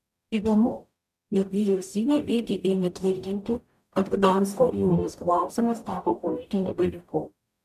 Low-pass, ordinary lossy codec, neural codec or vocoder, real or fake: 14.4 kHz; MP3, 96 kbps; codec, 44.1 kHz, 0.9 kbps, DAC; fake